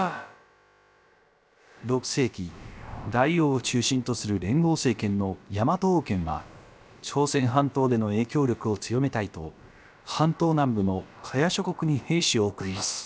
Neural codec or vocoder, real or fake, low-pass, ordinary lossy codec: codec, 16 kHz, about 1 kbps, DyCAST, with the encoder's durations; fake; none; none